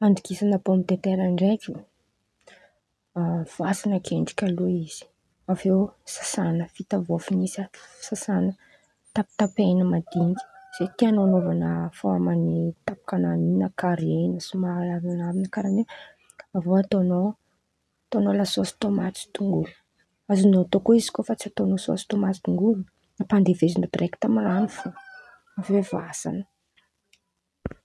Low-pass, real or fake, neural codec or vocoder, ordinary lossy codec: none; real; none; none